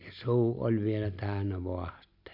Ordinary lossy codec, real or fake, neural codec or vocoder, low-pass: MP3, 48 kbps; real; none; 5.4 kHz